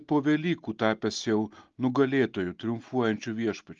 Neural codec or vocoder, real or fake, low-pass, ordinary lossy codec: none; real; 7.2 kHz; Opus, 32 kbps